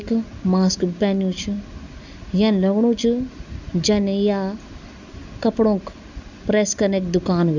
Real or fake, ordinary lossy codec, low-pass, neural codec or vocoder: real; none; 7.2 kHz; none